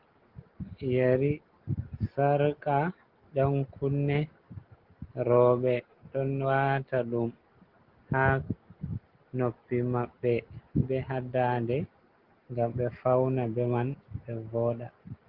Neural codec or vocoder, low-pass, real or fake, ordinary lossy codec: none; 5.4 kHz; real; Opus, 16 kbps